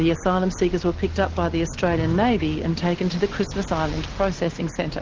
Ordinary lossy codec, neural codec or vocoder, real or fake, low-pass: Opus, 16 kbps; none; real; 7.2 kHz